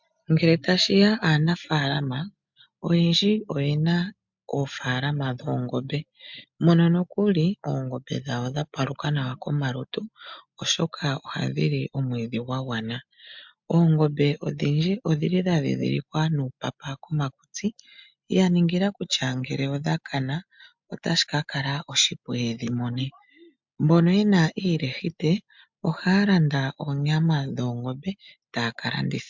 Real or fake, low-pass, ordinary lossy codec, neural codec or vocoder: real; 7.2 kHz; MP3, 64 kbps; none